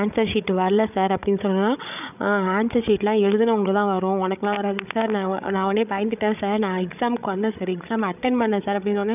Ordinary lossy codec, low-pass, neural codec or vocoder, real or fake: none; 3.6 kHz; codec, 16 kHz, 16 kbps, FreqCodec, larger model; fake